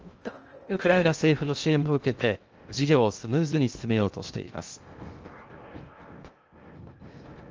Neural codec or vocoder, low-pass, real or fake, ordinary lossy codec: codec, 16 kHz in and 24 kHz out, 0.6 kbps, FocalCodec, streaming, 2048 codes; 7.2 kHz; fake; Opus, 24 kbps